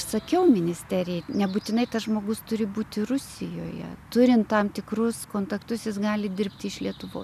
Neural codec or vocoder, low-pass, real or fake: none; 14.4 kHz; real